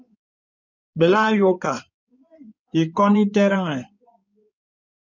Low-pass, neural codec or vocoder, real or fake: 7.2 kHz; codec, 16 kHz in and 24 kHz out, 2.2 kbps, FireRedTTS-2 codec; fake